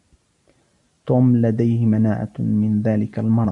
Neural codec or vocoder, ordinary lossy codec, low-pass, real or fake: none; AAC, 64 kbps; 10.8 kHz; real